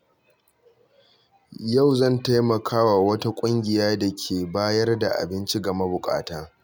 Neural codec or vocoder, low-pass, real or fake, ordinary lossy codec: none; none; real; none